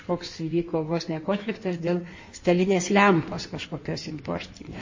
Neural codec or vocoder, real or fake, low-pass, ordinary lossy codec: codec, 16 kHz in and 24 kHz out, 1.1 kbps, FireRedTTS-2 codec; fake; 7.2 kHz; MP3, 32 kbps